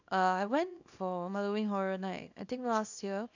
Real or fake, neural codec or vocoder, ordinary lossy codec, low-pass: fake; codec, 24 kHz, 0.9 kbps, WavTokenizer, small release; none; 7.2 kHz